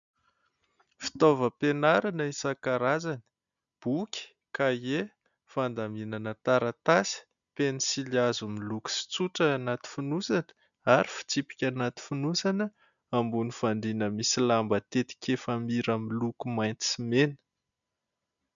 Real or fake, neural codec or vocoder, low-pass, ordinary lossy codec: real; none; 7.2 kHz; MP3, 96 kbps